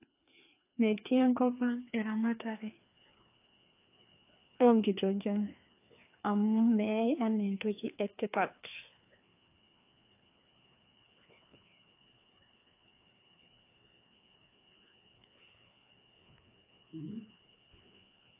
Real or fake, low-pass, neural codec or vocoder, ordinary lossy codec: fake; 3.6 kHz; codec, 16 kHz, 2 kbps, FreqCodec, larger model; AAC, 24 kbps